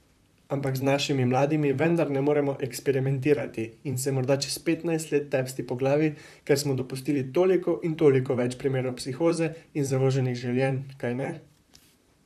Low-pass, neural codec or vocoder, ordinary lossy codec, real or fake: 14.4 kHz; vocoder, 44.1 kHz, 128 mel bands, Pupu-Vocoder; none; fake